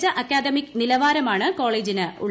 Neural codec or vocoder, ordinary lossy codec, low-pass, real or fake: none; none; none; real